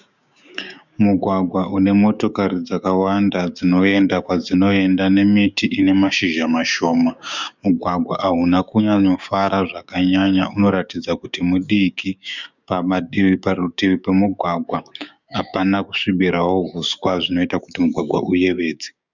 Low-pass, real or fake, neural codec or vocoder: 7.2 kHz; fake; codec, 16 kHz, 6 kbps, DAC